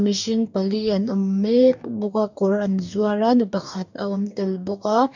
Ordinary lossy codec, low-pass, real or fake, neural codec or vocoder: none; 7.2 kHz; fake; codec, 44.1 kHz, 2.6 kbps, DAC